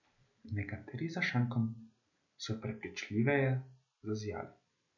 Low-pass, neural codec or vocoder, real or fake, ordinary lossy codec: 7.2 kHz; none; real; none